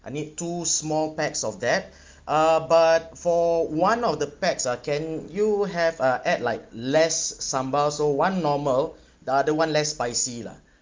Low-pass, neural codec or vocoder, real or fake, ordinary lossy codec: 7.2 kHz; none; real; Opus, 32 kbps